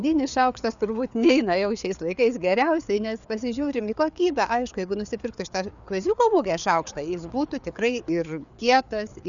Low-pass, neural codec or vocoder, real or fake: 7.2 kHz; codec, 16 kHz, 4 kbps, FreqCodec, larger model; fake